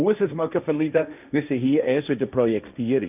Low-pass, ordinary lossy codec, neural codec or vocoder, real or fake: 3.6 kHz; none; codec, 16 kHz, 1.1 kbps, Voila-Tokenizer; fake